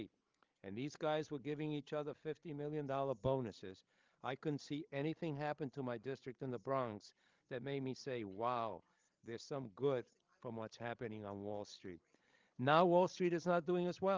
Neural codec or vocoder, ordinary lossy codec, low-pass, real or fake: codec, 16 kHz, 16 kbps, FreqCodec, larger model; Opus, 32 kbps; 7.2 kHz; fake